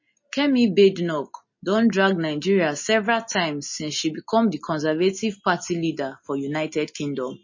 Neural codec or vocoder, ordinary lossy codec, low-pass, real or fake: none; MP3, 32 kbps; 7.2 kHz; real